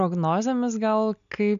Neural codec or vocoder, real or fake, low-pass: none; real; 7.2 kHz